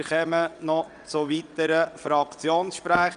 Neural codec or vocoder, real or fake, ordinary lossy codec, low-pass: vocoder, 22.05 kHz, 80 mel bands, WaveNeXt; fake; none; 9.9 kHz